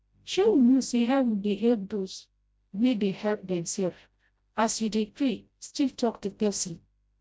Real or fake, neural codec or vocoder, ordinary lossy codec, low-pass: fake; codec, 16 kHz, 0.5 kbps, FreqCodec, smaller model; none; none